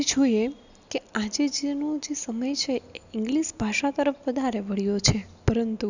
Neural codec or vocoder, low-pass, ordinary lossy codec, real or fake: none; 7.2 kHz; none; real